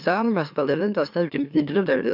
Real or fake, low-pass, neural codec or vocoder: fake; 5.4 kHz; autoencoder, 44.1 kHz, a latent of 192 numbers a frame, MeloTTS